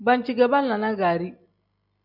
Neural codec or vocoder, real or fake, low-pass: none; real; 5.4 kHz